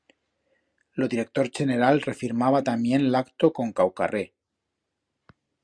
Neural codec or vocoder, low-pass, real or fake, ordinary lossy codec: none; 9.9 kHz; real; Opus, 64 kbps